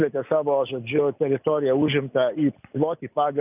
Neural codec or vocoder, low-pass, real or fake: none; 3.6 kHz; real